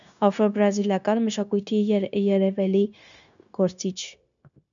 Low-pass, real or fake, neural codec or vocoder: 7.2 kHz; fake; codec, 16 kHz, 0.9 kbps, LongCat-Audio-Codec